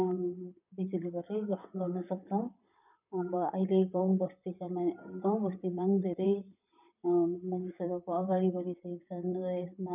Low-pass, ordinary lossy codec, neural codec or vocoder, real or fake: 3.6 kHz; none; vocoder, 44.1 kHz, 128 mel bands, Pupu-Vocoder; fake